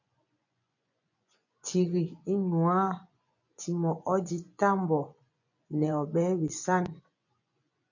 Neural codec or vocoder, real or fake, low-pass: vocoder, 24 kHz, 100 mel bands, Vocos; fake; 7.2 kHz